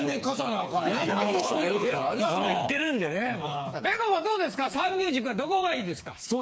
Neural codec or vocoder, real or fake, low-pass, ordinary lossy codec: codec, 16 kHz, 4 kbps, FreqCodec, smaller model; fake; none; none